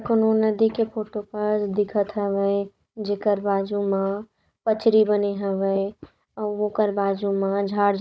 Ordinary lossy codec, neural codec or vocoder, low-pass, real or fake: none; codec, 16 kHz, 16 kbps, FunCodec, trained on Chinese and English, 50 frames a second; none; fake